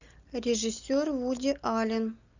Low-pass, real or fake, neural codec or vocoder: 7.2 kHz; real; none